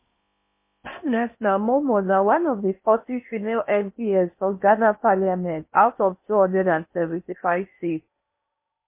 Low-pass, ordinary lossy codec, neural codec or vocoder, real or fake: 3.6 kHz; MP3, 24 kbps; codec, 16 kHz in and 24 kHz out, 0.6 kbps, FocalCodec, streaming, 4096 codes; fake